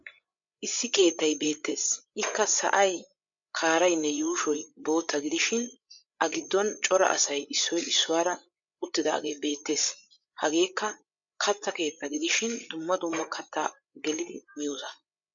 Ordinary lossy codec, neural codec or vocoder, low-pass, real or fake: MP3, 96 kbps; codec, 16 kHz, 8 kbps, FreqCodec, larger model; 7.2 kHz; fake